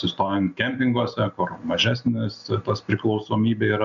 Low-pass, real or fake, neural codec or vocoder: 7.2 kHz; real; none